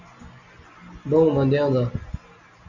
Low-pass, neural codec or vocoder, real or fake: 7.2 kHz; none; real